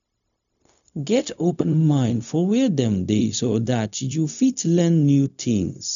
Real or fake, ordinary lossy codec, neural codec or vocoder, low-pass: fake; none; codec, 16 kHz, 0.4 kbps, LongCat-Audio-Codec; 7.2 kHz